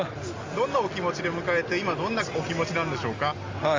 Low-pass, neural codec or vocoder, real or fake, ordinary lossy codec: 7.2 kHz; none; real; Opus, 32 kbps